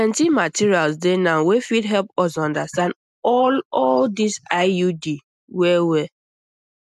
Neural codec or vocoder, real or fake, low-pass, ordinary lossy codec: none; real; 14.4 kHz; none